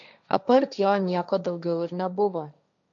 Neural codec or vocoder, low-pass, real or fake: codec, 16 kHz, 1.1 kbps, Voila-Tokenizer; 7.2 kHz; fake